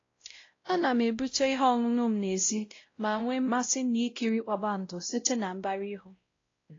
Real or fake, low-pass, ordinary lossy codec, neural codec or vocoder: fake; 7.2 kHz; AAC, 32 kbps; codec, 16 kHz, 0.5 kbps, X-Codec, WavLM features, trained on Multilingual LibriSpeech